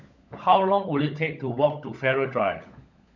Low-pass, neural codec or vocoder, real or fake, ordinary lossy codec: 7.2 kHz; codec, 16 kHz, 16 kbps, FunCodec, trained on LibriTTS, 50 frames a second; fake; none